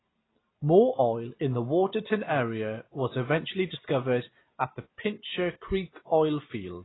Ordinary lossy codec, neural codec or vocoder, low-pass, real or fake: AAC, 16 kbps; none; 7.2 kHz; real